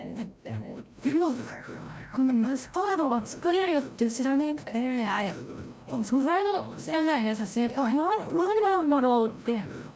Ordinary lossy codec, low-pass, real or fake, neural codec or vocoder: none; none; fake; codec, 16 kHz, 0.5 kbps, FreqCodec, larger model